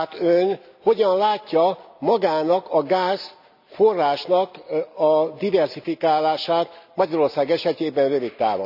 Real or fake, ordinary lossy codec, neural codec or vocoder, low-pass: real; none; none; 5.4 kHz